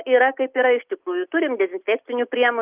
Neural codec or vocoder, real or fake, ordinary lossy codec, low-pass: none; real; Opus, 24 kbps; 3.6 kHz